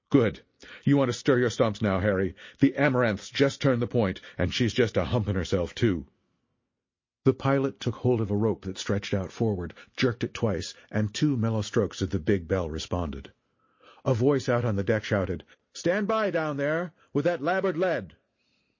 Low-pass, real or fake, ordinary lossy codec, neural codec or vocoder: 7.2 kHz; real; MP3, 32 kbps; none